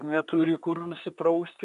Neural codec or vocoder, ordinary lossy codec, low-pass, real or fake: codec, 24 kHz, 1 kbps, SNAC; AAC, 96 kbps; 10.8 kHz; fake